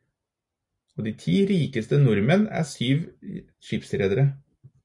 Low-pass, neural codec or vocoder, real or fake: 10.8 kHz; none; real